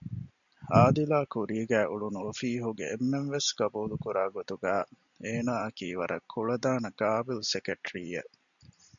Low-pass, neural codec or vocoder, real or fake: 7.2 kHz; none; real